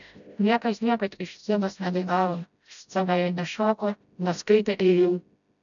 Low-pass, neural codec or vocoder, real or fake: 7.2 kHz; codec, 16 kHz, 0.5 kbps, FreqCodec, smaller model; fake